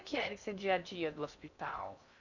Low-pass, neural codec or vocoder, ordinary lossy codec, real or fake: 7.2 kHz; codec, 16 kHz in and 24 kHz out, 0.6 kbps, FocalCodec, streaming, 4096 codes; none; fake